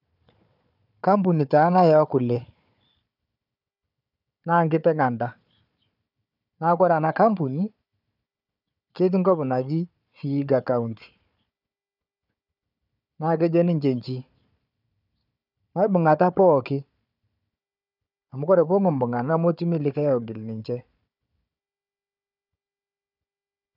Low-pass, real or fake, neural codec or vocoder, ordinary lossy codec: 5.4 kHz; fake; codec, 16 kHz, 4 kbps, FunCodec, trained on Chinese and English, 50 frames a second; none